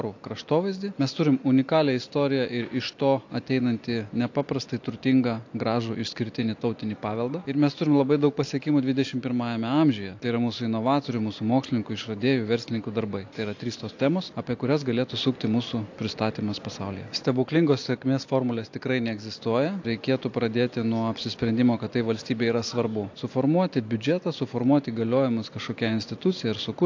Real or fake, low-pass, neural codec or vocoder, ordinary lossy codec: real; 7.2 kHz; none; AAC, 48 kbps